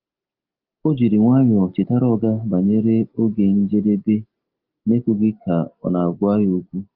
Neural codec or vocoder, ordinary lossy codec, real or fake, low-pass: none; Opus, 24 kbps; real; 5.4 kHz